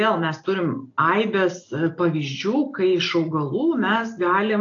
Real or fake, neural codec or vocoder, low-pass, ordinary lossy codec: real; none; 7.2 kHz; AAC, 48 kbps